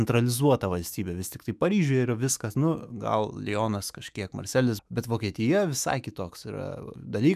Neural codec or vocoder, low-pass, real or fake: autoencoder, 48 kHz, 128 numbers a frame, DAC-VAE, trained on Japanese speech; 14.4 kHz; fake